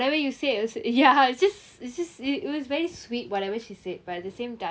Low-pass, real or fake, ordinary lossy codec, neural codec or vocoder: none; real; none; none